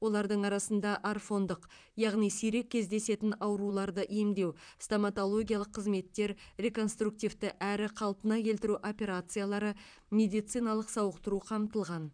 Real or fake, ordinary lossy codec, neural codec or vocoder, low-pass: fake; none; vocoder, 44.1 kHz, 128 mel bands, Pupu-Vocoder; 9.9 kHz